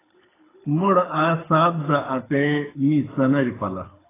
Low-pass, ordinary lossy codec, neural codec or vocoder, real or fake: 3.6 kHz; AAC, 16 kbps; codec, 24 kHz, 6 kbps, HILCodec; fake